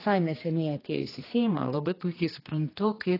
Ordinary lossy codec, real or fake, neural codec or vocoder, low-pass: AAC, 24 kbps; fake; codec, 16 kHz, 2 kbps, X-Codec, HuBERT features, trained on general audio; 5.4 kHz